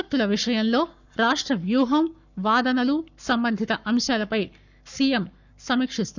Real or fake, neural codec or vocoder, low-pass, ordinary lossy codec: fake; codec, 44.1 kHz, 7.8 kbps, Pupu-Codec; 7.2 kHz; none